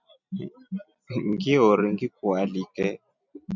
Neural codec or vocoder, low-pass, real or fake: none; 7.2 kHz; real